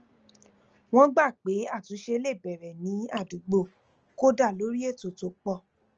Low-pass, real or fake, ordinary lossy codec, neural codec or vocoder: 7.2 kHz; real; Opus, 24 kbps; none